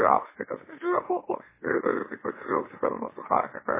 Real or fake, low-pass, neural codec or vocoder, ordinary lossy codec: fake; 3.6 kHz; autoencoder, 44.1 kHz, a latent of 192 numbers a frame, MeloTTS; MP3, 16 kbps